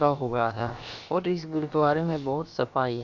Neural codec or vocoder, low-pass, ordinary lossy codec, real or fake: codec, 16 kHz, about 1 kbps, DyCAST, with the encoder's durations; 7.2 kHz; none; fake